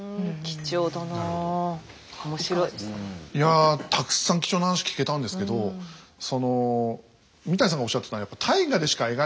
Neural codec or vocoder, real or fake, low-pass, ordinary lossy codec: none; real; none; none